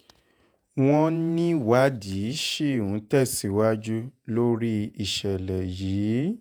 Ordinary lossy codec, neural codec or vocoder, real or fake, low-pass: none; vocoder, 48 kHz, 128 mel bands, Vocos; fake; none